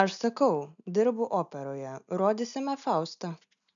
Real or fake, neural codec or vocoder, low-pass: real; none; 7.2 kHz